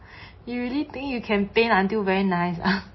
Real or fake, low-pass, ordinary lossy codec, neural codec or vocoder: real; 7.2 kHz; MP3, 24 kbps; none